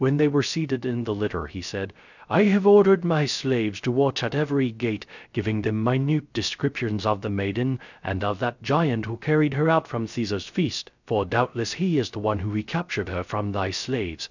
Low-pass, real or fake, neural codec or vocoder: 7.2 kHz; fake; codec, 16 kHz, 0.3 kbps, FocalCodec